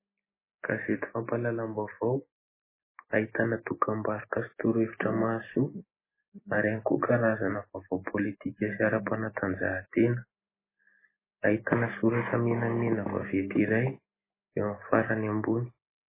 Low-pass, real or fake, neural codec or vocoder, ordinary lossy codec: 3.6 kHz; real; none; MP3, 16 kbps